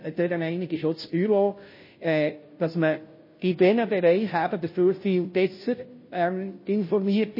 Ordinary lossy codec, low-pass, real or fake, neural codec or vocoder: MP3, 24 kbps; 5.4 kHz; fake; codec, 16 kHz, 0.5 kbps, FunCodec, trained on Chinese and English, 25 frames a second